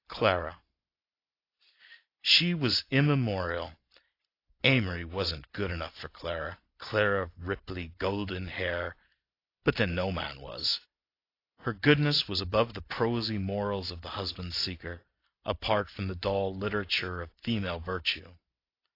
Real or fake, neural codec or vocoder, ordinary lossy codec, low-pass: real; none; AAC, 32 kbps; 5.4 kHz